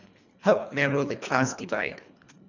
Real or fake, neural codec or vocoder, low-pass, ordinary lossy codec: fake; codec, 24 kHz, 1.5 kbps, HILCodec; 7.2 kHz; none